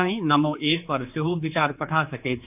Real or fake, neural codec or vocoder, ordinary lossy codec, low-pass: fake; codec, 16 kHz, 4 kbps, X-Codec, HuBERT features, trained on general audio; AAC, 32 kbps; 3.6 kHz